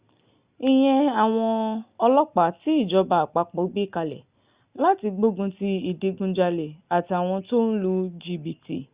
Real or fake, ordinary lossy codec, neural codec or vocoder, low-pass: real; Opus, 64 kbps; none; 3.6 kHz